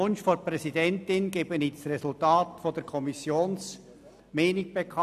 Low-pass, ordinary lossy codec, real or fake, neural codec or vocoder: 14.4 kHz; none; real; none